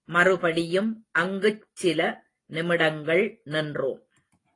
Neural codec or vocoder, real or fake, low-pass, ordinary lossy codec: none; real; 10.8 kHz; AAC, 32 kbps